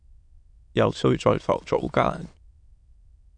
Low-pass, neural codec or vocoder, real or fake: 9.9 kHz; autoencoder, 22.05 kHz, a latent of 192 numbers a frame, VITS, trained on many speakers; fake